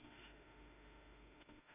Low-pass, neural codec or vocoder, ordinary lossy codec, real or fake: 3.6 kHz; autoencoder, 48 kHz, 32 numbers a frame, DAC-VAE, trained on Japanese speech; MP3, 32 kbps; fake